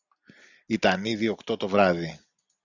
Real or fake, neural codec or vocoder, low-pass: real; none; 7.2 kHz